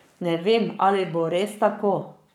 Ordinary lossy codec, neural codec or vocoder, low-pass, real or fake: none; codec, 44.1 kHz, 7.8 kbps, Pupu-Codec; 19.8 kHz; fake